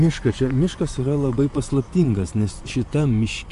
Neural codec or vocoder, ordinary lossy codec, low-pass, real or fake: vocoder, 24 kHz, 100 mel bands, Vocos; AAC, 64 kbps; 10.8 kHz; fake